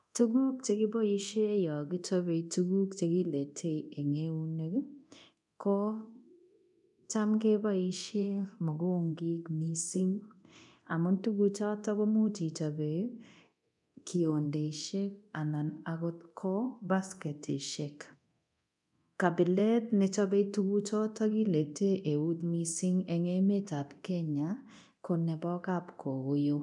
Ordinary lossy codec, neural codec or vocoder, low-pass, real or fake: none; codec, 24 kHz, 0.9 kbps, DualCodec; 10.8 kHz; fake